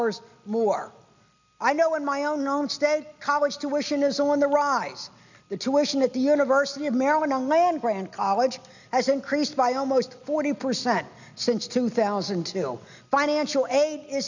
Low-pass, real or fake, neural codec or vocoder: 7.2 kHz; real; none